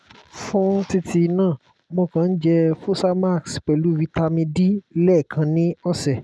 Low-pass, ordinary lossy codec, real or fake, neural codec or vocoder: none; none; real; none